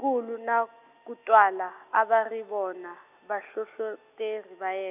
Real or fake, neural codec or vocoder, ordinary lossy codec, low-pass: real; none; none; 3.6 kHz